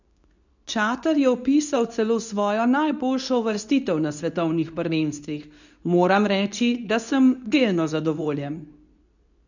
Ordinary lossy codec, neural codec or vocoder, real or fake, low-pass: none; codec, 24 kHz, 0.9 kbps, WavTokenizer, medium speech release version 2; fake; 7.2 kHz